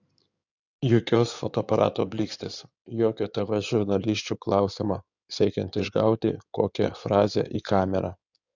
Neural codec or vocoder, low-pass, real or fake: codec, 16 kHz in and 24 kHz out, 2.2 kbps, FireRedTTS-2 codec; 7.2 kHz; fake